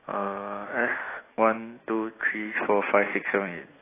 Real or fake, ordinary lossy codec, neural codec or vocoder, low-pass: fake; AAC, 16 kbps; autoencoder, 48 kHz, 128 numbers a frame, DAC-VAE, trained on Japanese speech; 3.6 kHz